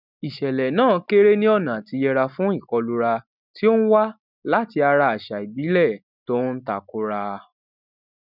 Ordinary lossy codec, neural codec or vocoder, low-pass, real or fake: none; none; 5.4 kHz; real